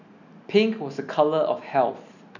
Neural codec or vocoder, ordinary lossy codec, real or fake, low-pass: none; none; real; 7.2 kHz